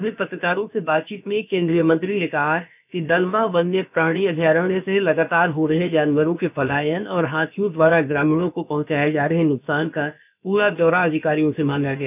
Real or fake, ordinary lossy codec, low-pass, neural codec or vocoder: fake; none; 3.6 kHz; codec, 16 kHz, about 1 kbps, DyCAST, with the encoder's durations